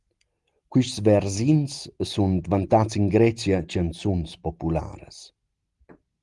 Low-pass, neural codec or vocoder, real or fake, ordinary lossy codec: 10.8 kHz; none; real; Opus, 24 kbps